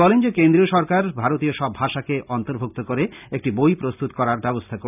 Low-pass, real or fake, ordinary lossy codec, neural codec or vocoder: 3.6 kHz; real; none; none